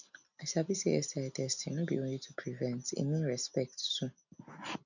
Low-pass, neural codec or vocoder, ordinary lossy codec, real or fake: 7.2 kHz; none; none; real